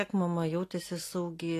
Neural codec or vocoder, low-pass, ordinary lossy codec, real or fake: none; 14.4 kHz; AAC, 48 kbps; real